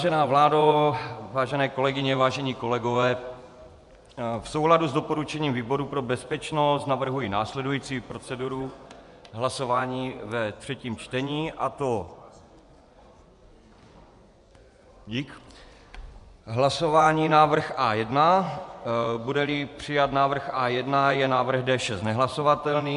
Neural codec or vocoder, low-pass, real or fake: vocoder, 24 kHz, 100 mel bands, Vocos; 10.8 kHz; fake